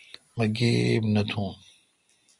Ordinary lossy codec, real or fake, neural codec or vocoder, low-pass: MP3, 96 kbps; real; none; 10.8 kHz